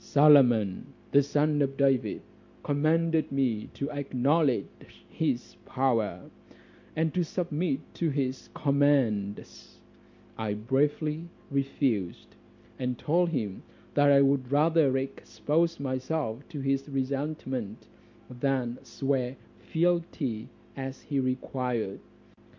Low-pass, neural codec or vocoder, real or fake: 7.2 kHz; none; real